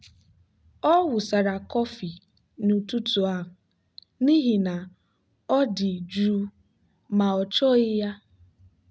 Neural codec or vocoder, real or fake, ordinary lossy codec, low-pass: none; real; none; none